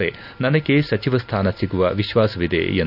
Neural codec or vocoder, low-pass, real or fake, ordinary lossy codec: none; 5.4 kHz; real; none